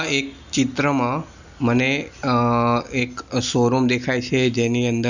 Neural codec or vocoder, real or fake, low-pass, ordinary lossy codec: none; real; 7.2 kHz; none